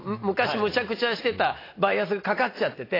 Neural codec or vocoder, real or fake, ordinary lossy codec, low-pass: none; real; AAC, 24 kbps; 5.4 kHz